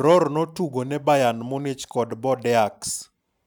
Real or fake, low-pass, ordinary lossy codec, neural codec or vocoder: real; none; none; none